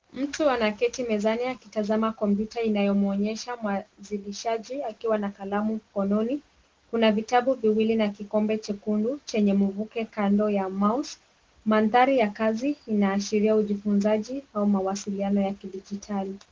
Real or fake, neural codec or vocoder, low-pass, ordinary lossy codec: real; none; 7.2 kHz; Opus, 16 kbps